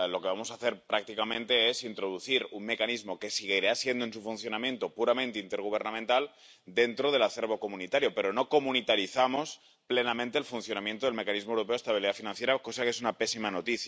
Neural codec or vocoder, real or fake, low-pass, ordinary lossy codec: none; real; none; none